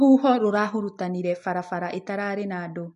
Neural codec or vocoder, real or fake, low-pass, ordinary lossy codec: none; real; 14.4 kHz; MP3, 48 kbps